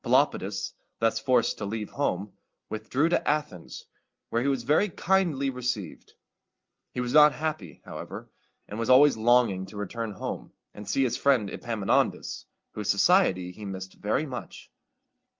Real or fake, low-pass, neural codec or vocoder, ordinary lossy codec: real; 7.2 kHz; none; Opus, 32 kbps